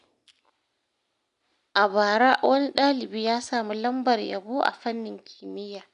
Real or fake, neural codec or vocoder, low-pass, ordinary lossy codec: real; none; 10.8 kHz; none